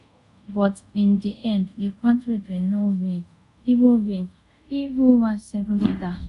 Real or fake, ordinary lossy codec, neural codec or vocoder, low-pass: fake; MP3, 96 kbps; codec, 24 kHz, 0.5 kbps, DualCodec; 10.8 kHz